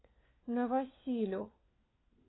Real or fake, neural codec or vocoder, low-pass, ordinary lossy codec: fake; codec, 16 kHz, 4 kbps, FunCodec, trained on LibriTTS, 50 frames a second; 7.2 kHz; AAC, 16 kbps